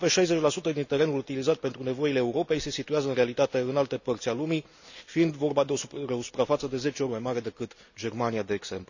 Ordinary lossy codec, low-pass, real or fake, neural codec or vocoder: none; 7.2 kHz; real; none